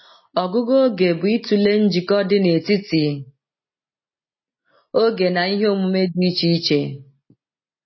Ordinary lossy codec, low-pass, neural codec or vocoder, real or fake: MP3, 24 kbps; 7.2 kHz; none; real